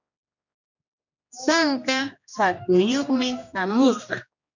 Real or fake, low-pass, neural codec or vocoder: fake; 7.2 kHz; codec, 16 kHz, 1 kbps, X-Codec, HuBERT features, trained on general audio